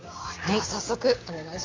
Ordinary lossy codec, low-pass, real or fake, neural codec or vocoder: AAC, 32 kbps; 7.2 kHz; fake; codec, 16 kHz, 6 kbps, DAC